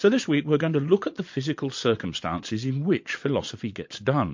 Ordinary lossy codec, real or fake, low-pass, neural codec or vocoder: MP3, 48 kbps; fake; 7.2 kHz; vocoder, 22.05 kHz, 80 mel bands, Vocos